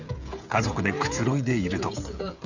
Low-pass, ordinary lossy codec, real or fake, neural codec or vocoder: 7.2 kHz; none; fake; codec, 16 kHz, 16 kbps, FreqCodec, smaller model